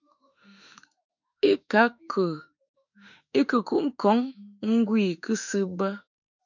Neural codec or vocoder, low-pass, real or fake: autoencoder, 48 kHz, 32 numbers a frame, DAC-VAE, trained on Japanese speech; 7.2 kHz; fake